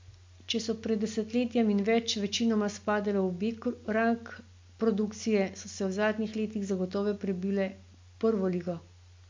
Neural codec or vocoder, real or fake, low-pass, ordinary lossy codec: none; real; 7.2 kHz; MP3, 48 kbps